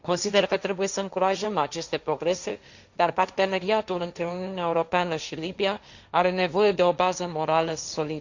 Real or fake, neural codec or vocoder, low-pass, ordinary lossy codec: fake; codec, 16 kHz, 1.1 kbps, Voila-Tokenizer; 7.2 kHz; Opus, 64 kbps